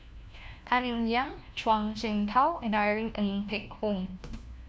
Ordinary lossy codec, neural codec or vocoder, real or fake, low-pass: none; codec, 16 kHz, 1 kbps, FunCodec, trained on LibriTTS, 50 frames a second; fake; none